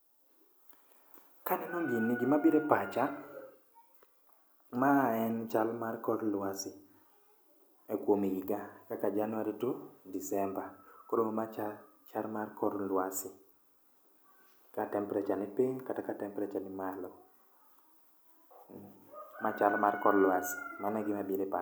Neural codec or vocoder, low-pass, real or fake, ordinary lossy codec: none; none; real; none